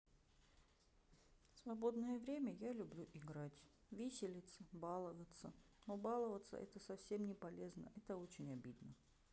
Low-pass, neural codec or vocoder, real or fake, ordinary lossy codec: none; none; real; none